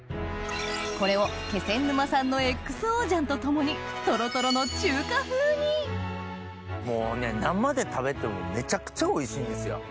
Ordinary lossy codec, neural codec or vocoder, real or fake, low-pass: none; none; real; none